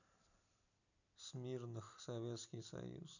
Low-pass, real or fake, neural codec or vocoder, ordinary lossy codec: 7.2 kHz; fake; vocoder, 44.1 kHz, 128 mel bands every 256 samples, BigVGAN v2; none